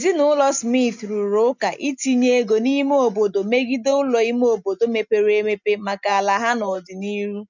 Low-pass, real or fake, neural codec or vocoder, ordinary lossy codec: 7.2 kHz; real; none; none